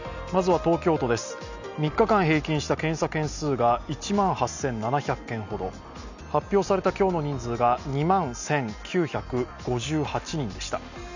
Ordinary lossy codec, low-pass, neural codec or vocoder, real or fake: none; 7.2 kHz; none; real